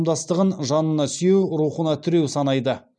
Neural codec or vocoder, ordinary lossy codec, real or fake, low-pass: none; none; real; 9.9 kHz